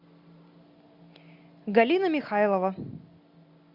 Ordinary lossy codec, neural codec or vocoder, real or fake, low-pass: MP3, 48 kbps; none; real; 5.4 kHz